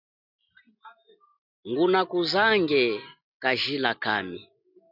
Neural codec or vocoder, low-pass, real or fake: none; 5.4 kHz; real